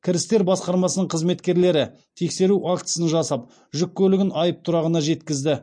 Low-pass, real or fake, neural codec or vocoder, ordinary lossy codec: 9.9 kHz; real; none; MP3, 48 kbps